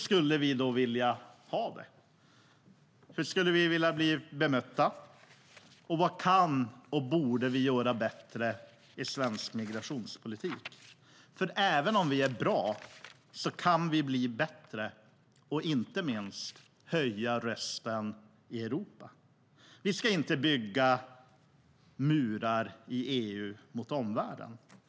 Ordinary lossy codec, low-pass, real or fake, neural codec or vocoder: none; none; real; none